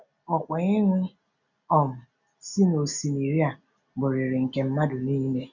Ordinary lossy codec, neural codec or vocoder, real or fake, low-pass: none; none; real; 7.2 kHz